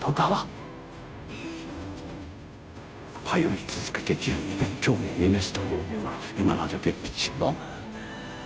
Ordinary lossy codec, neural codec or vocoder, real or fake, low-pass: none; codec, 16 kHz, 0.5 kbps, FunCodec, trained on Chinese and English, 25 frames a second; fake; none